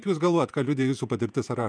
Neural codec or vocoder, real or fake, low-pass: vocoder, 48 kHz, 128 mel bands, Vocos; fake; 9.9 kHz